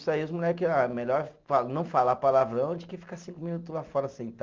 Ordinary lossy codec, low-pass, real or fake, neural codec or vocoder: Opus, 24 kbps; 7.2 kHz; real; none